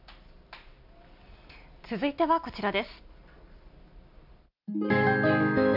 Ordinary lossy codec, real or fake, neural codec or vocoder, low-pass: none; real; none; 5.4 kHz